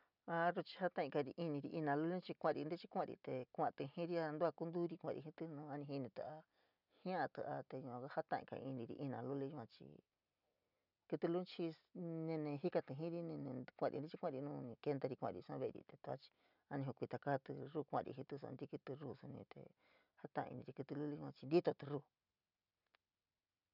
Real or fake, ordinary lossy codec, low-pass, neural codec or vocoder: real; none; 5.4 kHz; none